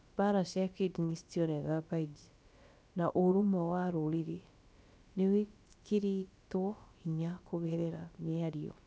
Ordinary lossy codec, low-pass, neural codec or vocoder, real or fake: none; none; codec, 16 kHz, about 1 kbps, DyCAST, with the encoder's durations; fake